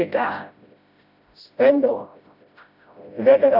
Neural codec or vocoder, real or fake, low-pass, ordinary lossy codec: codec, 16 kHz, 0.5 kbps, FreqCodec, smaller model; fake; 5.4 kHz; AAC, 48 kbps